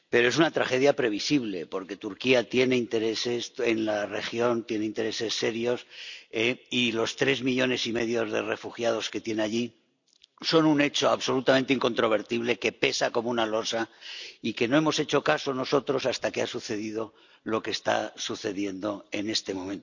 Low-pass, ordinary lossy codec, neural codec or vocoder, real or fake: 7.2 kHz; none; none; real